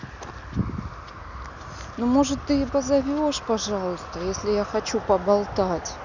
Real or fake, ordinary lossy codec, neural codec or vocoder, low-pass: real; none; none; 7.2 kHz